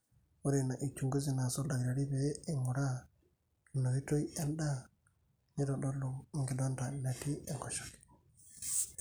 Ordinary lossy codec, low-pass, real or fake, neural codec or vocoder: none; none; real; none